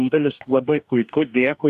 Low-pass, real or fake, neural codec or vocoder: 14.4 kHz; fake; codec, 44.1 kHz, 2.6 kbps, DAC